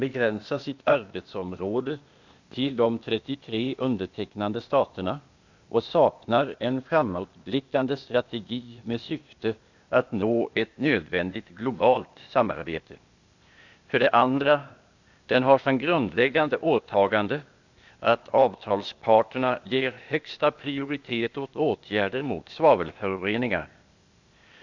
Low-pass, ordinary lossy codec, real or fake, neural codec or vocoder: 7.2 kHz; Opus, 64 kbps; fake; codec, 16 kHz, 0.8 kbps, ZipCodec